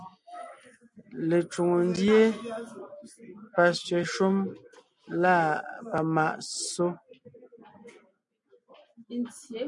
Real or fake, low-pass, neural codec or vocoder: real; 10.8 kHz; none